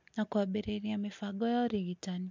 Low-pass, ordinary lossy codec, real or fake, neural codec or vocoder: 7.2 kHz; MP3, 64 kbps; real; none